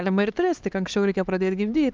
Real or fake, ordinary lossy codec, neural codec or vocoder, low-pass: fake; Opus, 24 kbps; codec, 16 kHz, 4 kbps, X-Codec, HuBERT features, trained on LibriSpeech; 7.2 kHz